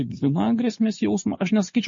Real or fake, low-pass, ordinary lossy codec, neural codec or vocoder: real; 7.2 kHz; MP3, 32 kbps; none